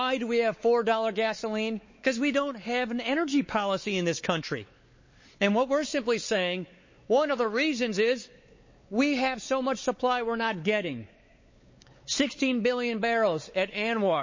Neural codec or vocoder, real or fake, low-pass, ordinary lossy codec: codec, 16 kHz, 4 kbps, X-Codec, WavLM features, trained on Multilingual LibriSpeech; fake; 7.2 kHz; MP3, 32 kbps